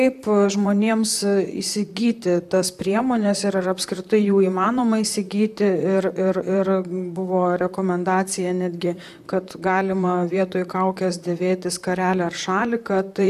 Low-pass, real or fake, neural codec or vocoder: 14.4 kHz; fake; vocoder, 44.1 kHz, 128 mel bands, Pupu-Vocoder